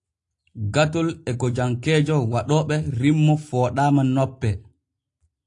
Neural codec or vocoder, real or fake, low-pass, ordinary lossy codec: none; real; 10.8 kHz; AAC, 48 kbps